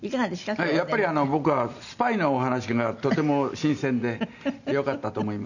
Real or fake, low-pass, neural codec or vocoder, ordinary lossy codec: real; 7.2 kHz; none; none